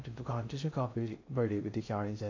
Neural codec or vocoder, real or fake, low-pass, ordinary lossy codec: codec, 16 kHz in and 24 kHz out, 0.6 kbps, FocalCodec, streaming, 2048 codes; fake; 7.2 kHz; AAC, 48 kbps